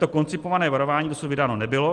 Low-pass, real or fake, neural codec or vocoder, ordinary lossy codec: 10.8 kHz; real; none; Opus, 24 kbps